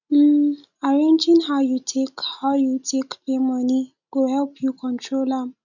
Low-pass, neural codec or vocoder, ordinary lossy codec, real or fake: 7.2 kHz; none; none; real